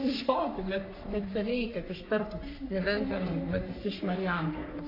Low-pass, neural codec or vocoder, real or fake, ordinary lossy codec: 5.4 kHz; codec, 44.1 kHz, 3.4 kbps, Pupu-Codec; fake; AAC, 32 kbps